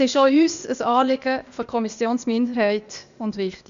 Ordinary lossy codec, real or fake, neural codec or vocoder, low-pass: Opus, 64 kbps; fake; codec, 16 kHz, 0.8 kbps, ZipCodec; 7.2 kHz